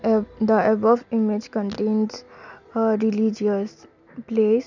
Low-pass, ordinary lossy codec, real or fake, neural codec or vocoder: 7.2 kHz; none; real; none